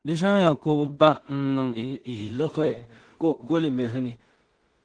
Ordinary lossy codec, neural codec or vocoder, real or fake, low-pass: Opus, 16 kbps; codec, 16 kHz in and 24 kHz out, 0.4 kbps, LongCat-Audio-Codec, two codebook decoder; fake; 9.9 kHz